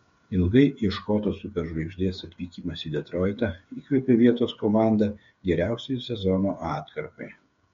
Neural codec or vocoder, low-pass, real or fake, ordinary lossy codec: codec, 16 kHz, 8 kbps, FreqCodec, smaller model; 7.2 kHz; fake; MP3, 48 kbps